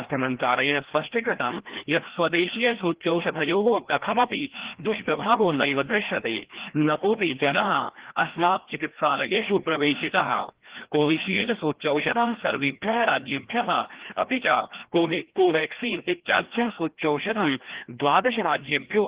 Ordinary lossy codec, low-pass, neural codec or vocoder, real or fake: Opus, 16 kbps; 3.6 kHz; codec, 16 kHz, 1 kbps, FreqCodec, larger model; fake